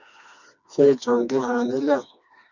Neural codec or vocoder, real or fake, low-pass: codec, 16 kHz, 2 kbps, FreqCodec, smaller model; fake; 7.2 kHz